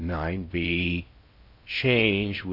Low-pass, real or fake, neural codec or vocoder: 5.4 kHz; fake; codec, 16 kHz in and 24 kHz out, 0.6 kbps, FocalCodec, streaming, 4096 codes